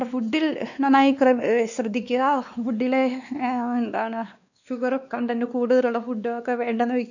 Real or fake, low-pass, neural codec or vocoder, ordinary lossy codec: fake; 7.2 kHz; codec, 16 kHz, 2 kbps, X-Codec, WavLM features, trained on Multilingual LibriSpeech; none